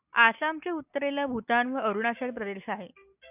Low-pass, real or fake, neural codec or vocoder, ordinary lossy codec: 3.6 kHz; real; none; AAC, 32 kbps